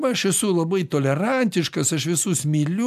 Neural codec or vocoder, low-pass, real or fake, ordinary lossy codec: none; 14.4 kHz; real; MP3, 96 kbps